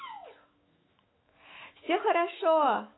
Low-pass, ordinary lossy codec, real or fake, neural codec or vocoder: 7.2 kHz; AAC, 16 kbps; fake; autoencoder, 48 kHz, 128 numbers a frame, DAC-VAE, trained on Japanese speech